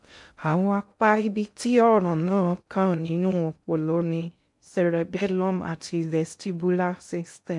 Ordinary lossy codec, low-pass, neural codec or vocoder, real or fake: MP3, 64 kbps; 10.8 kHz; codec, 16 kHz in and 24 kHz out, 0.6 kbps, FocalCodec, streaming, 2048 codes; fake